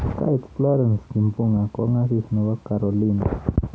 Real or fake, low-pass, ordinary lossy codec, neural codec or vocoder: real; none; none; none